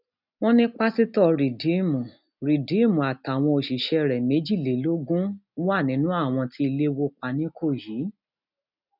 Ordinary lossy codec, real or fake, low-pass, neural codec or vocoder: none; real; 5.4 kHz; none